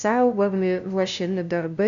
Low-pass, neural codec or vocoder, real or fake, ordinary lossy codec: 7.2 kHz; codec, 16 kHz, 0.5 kbps, FunCodec, trained on LibriTTS, 25 frames a second; fake; MP3, 96 kbps